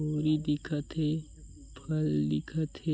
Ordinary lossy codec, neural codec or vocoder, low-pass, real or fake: none; none; none; real